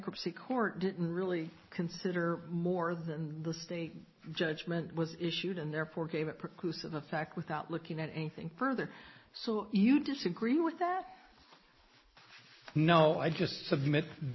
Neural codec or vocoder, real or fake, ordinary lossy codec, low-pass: none; real; MP3, 24 kbps; 7.2 kHz